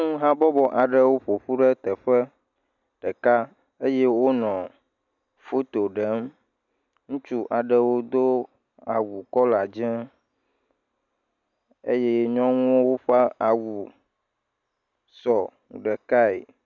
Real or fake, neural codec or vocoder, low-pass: real; none; 7.2 kHz